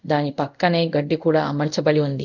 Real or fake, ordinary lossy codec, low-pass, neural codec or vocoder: fake; none; 7.2 kHz; codec, 24 kHz, 0.5 kbps, DualCodec